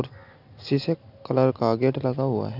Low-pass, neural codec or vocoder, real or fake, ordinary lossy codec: 5.4 kHz; none; real; none